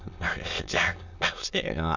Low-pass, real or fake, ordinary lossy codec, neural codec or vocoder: 7.2 kHz; fake; none; autoencoder, 22.05 kHz, a latent of 192 numbers a frame, VITS, trained on many speakers